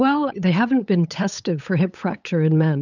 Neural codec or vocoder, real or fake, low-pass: codec, 16 kHz, 8 kbps, FunCodec, trained on LibriTTS, 25 frames a second; fake; 7.2 kHz